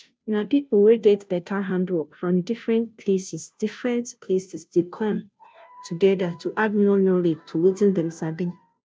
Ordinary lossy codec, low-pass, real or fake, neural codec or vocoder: none; none; fake; codec, 16 kHz, 0.5 kbps, FunCodec, trained on Chinese and English, 25 frames a second